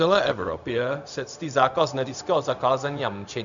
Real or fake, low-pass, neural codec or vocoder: fake; 7.2 kHz; codec, 16 kHz, 0.4 kbps, LongCat-Audio-Codec